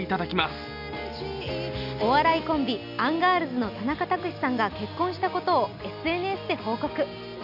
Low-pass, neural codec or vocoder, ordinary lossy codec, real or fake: 5.4 kHz; none; none; real